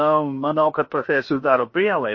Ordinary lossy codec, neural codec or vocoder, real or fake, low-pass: MP3, 32 kbps; codec, 16 kHz, about 1 kbps, DyCAST, with the encoder's durations; fake; 7.2 kHz